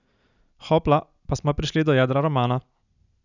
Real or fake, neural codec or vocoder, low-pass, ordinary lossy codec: real; none; 7.2 kHz; none